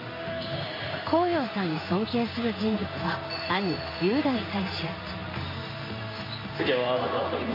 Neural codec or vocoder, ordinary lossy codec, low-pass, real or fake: codec, 16 kHz in and 24 kHz out, 1 kbps, XY-Tokenizer; MP3, 24 kbps; 5.4 kHz; fake